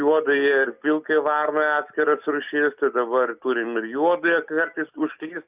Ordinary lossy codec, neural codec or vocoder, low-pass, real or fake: Opus, 64 kbps; none; 3.6 kHz; real